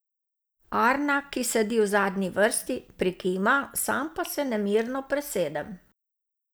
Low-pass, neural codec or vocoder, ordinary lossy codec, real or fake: none; none; none; real